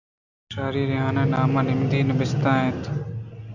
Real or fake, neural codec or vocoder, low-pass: real; none; 7.2 kHz